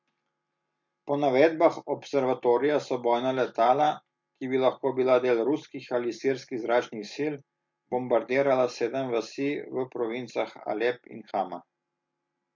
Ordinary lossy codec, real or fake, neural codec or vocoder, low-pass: MP3, 48 kbps; real; none; 7.2 kHz